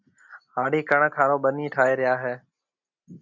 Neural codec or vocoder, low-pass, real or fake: none; 7.2 kHz; real